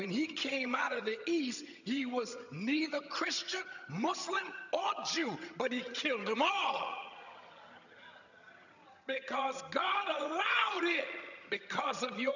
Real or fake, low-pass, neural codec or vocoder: fake; 7.2 kHz; vocoder, 22.05 kHz, 80 mel bands, HiFi-GAN